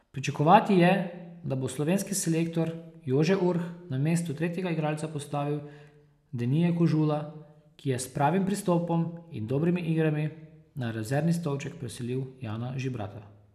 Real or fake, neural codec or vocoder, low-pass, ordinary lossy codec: real; none; 14.4 kHz; none